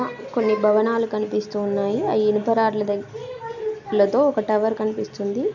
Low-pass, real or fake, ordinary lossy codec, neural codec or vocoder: 7.2 kHz; real; none; none